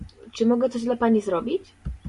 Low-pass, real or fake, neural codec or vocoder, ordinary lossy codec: 14.4 kHz; real; none; MP3, 48 kbps